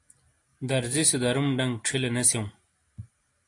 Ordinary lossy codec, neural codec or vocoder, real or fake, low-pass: AAC, 48 kbps; none; real; 10.8 kHz